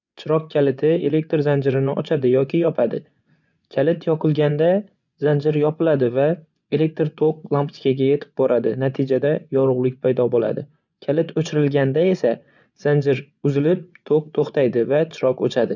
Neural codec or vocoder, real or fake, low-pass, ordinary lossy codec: vocoder, 24 kHz, 100 mel bands, Vocos; fake; 7.2 kHz; none